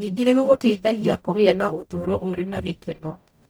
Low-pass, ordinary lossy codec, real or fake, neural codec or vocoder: none; none; fake; codec, 44.1 kHz, 0.9 kbps, DAC